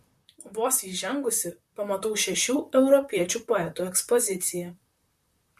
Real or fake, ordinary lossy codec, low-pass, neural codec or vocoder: fake; MP3, 64 kbps; 14.4 kHz; vocoder, 44.1 kHz, 128 mel bands, Pupu-Vocoder